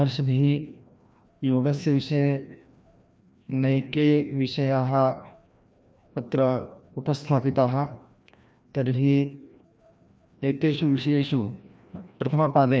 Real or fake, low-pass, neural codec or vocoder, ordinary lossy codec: fake; none; codec, 16 kHz, 1 kbps, FreqCodec, larger model; none